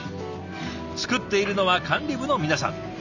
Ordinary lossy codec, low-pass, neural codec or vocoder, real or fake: none; 7.2 kHz; none; real